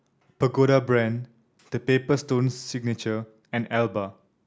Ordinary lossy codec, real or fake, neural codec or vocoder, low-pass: none; real; none; none